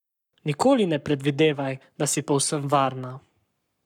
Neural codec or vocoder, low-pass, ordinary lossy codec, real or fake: codec, 44.1 kHz, 7.8 kbps, Pupu-Codec; 19.8 kHz; none; fake